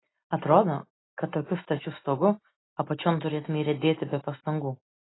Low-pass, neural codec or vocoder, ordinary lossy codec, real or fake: 7.2 kHz; none; AAC, 16 kbps; real